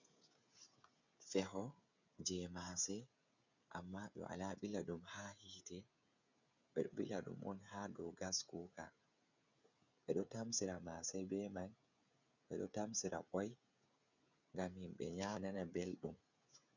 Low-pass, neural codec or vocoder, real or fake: 7.2 kHz; codec, 16 kHz, 16 kbps, FreqCodec, smaller model; fake